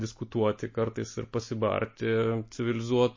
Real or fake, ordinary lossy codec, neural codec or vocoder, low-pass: real; MP3, 32 kbps; none; 7.2 kHz